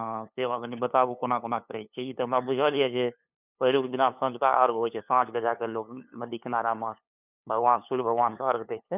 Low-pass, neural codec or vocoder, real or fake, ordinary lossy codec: 3.6 kHz; codec, 16 kHz, 2 kbps, FunCodec, trained on LibriTTS, 25 frames a second; fake; none